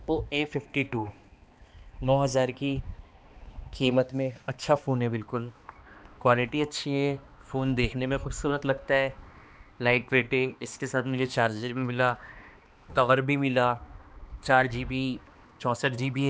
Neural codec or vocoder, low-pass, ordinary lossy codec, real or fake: codec, 16 kHz, 2 kbps, X-Codec, HuBERT features, trained on balanced general audio; none; none; fake